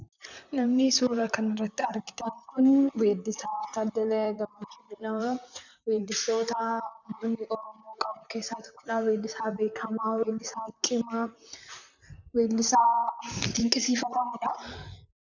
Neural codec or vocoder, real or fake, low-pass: vocoder, 44.1 kHz, 128 mel bands, Pupu-Vocoder; fake; 7.2 kHz